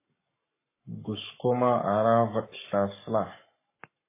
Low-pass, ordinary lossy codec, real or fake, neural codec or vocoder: 3.6 kHz; MP3, 16 kbps; fake; codec, 44.1 kHz, 7.8 kbps, Pupu-Codec